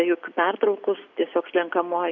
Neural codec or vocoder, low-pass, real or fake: none; 7.2 kHz; real